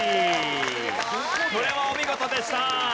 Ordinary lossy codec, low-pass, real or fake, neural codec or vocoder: none; none; real; none